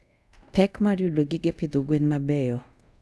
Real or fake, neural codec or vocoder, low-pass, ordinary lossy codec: fake; codec, 24 kHz, 0.5 kbps, DualCodec; none; none